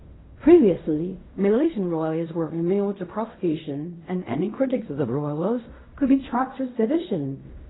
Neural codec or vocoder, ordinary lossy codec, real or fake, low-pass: codec, 16 kHz in and 24 kHz out, 0.4 kbps, LongCat-Audio-Codec, fine tuned four codebook decoder; AAC, 16 kbps; fake; 7.2 kHz